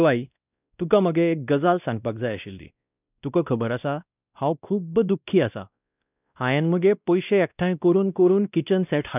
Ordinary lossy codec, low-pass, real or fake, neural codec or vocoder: none; 3.6 kHz; fake; codec, 16 kHz, 1 kbps, X-Codec, WavLM features, trained on Multilingual LibriSpeech